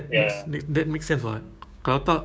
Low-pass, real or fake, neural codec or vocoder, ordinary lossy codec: none; fake; codec, 16 kHz, 6 kbps, DAC; none